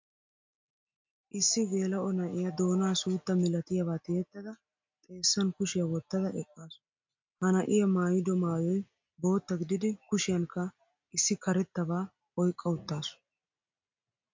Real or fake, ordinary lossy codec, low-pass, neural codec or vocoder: real; MP3, 48 kbps; 7.2 kHz; none